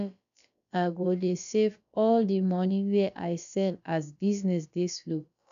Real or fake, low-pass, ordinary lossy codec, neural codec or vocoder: fake; 7.2 kHz; none; codec, 16 kHz, about 1 kbps, DyCAST, with the encoder's durations